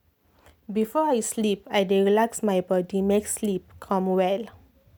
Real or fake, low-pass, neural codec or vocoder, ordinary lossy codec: real; none; none; none